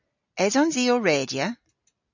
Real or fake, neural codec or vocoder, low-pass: real; none; 7.2 kHz